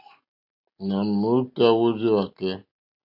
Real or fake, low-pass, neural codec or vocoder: real; 5.4 kHz; none